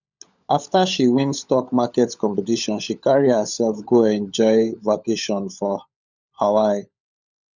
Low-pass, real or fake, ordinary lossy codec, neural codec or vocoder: 7.2 kHz; fake; none; codec, 16 kHz, 16 kbps, FunCodec, trained on LibriTTS, 50 frames a second